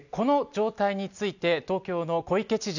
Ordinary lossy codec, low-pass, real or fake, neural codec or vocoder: none; 7.2 kHz; real; none